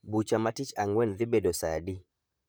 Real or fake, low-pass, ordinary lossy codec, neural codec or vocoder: fake; none; none; vocoder, 44.1 kHz, 128 mel bands, Pupu-Vocoder